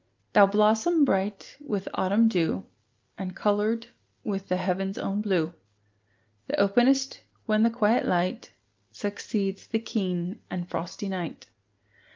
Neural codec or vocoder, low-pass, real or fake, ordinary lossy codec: none; 7.2 kHz; real; Opus, 24 kbps